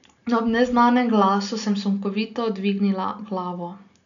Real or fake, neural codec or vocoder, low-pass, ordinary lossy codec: real; none; 7.2 kHz; none